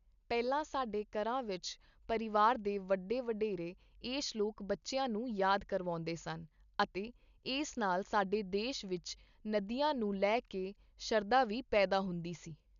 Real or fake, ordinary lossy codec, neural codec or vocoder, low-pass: real; none; none; 7.2 kHz